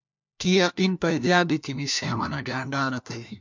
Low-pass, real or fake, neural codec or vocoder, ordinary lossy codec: 7.2 kHz; fake; codec, 16 kHz, 1 kbps, FunCodec, trained on LibriTTS, 50 frames a second; MP3, 64 kbps